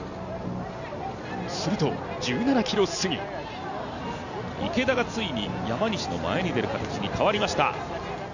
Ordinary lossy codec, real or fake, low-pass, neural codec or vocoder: none; real; 7.2 kHz; none